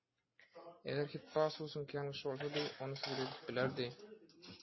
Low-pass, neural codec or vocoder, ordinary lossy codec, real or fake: 7.2 kHz; none; MP3, 24 kbps; real